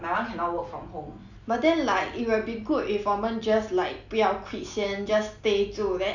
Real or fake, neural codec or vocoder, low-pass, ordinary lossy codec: real; none; 7.2 kHz; none